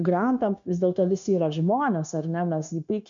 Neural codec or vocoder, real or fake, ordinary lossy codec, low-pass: codec, 16 kHz, 0.9 kbps, LongCat-Audio-Codec; fake; MP3, 96 kbps; 7.2 kHz